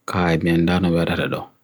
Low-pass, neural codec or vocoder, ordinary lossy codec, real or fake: none; none; none; real